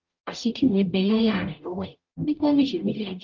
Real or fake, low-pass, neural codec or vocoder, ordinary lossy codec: fake; 7.2 kHz; codec, 44.1 kHz, 0.9 kbps, DAC; Opus, 32 kbps